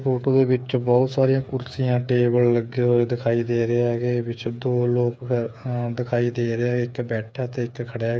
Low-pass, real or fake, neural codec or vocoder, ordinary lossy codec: none; fake; codec, 16 kHz, 8 kbps, FreqCodec, smaller model; none